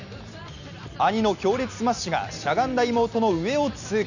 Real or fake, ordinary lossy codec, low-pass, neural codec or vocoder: real; none; 7.2 kHz; none